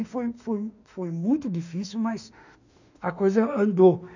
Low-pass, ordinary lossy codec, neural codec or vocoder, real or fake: 7.2 kHz; none; autoencoder, 48 kHz, 32 numbers a frame, DAC-VAE, trained on Japanese speech; fake